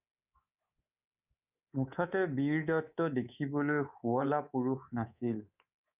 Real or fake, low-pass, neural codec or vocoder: fake; 3.6 kHz; codec, 24 kHz, 3.1 kbps, DualCodec